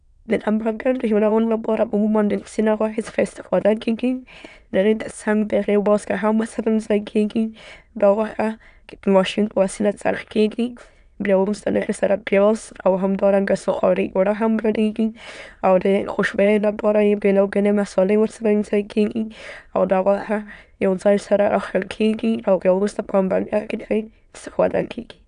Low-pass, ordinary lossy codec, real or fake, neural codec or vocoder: 9.9 kHz; none; fake; autoencoder, 22.05 kHz, a latent of 192 numbers a frame, VITS, trained on many speakers